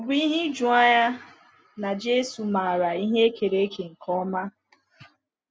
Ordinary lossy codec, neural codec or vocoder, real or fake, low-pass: none; none; real; none